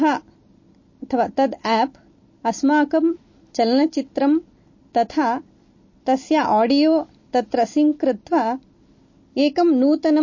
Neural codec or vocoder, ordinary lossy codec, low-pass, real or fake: none; MP3, 32 kbps; 7.2 kHz; real